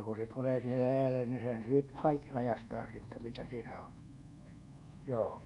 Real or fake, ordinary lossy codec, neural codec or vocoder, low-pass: fake; none; codec, 24 kHz, 1.2 kbps, DualCodec; 10.8 kHz